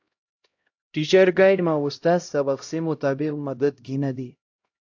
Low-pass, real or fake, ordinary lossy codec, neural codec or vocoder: 7.2 kHz; fake; AAC, 48 kbps; codec, 16 kHz, 0.5 kbps, X-Codec, HuBERT features, trained on LibriSpeech